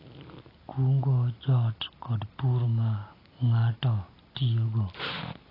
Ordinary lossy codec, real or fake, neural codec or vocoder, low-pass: AAC, 24 kbps; real; none; 5.4 kHz